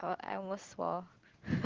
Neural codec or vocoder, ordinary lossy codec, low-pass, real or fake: none; Opus, 16 kbps; 7.2 kHz; real